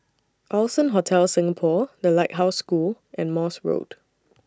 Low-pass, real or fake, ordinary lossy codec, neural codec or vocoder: none; real; none; none